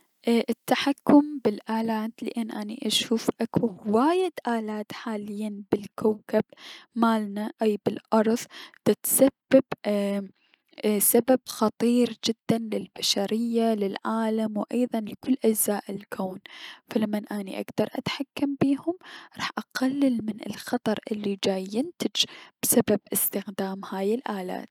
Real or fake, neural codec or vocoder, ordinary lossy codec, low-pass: real; none; none; 19.8 kHz